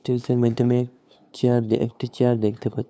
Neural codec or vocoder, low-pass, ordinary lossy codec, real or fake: codec, 16 kHz, 2 kbps, FunCodec, trained on LibriTTS, 25 frames a second; none; none; fake